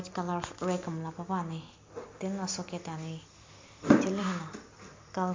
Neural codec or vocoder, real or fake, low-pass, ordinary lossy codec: none; real; 7.2 kHz; MP3, 48 kbps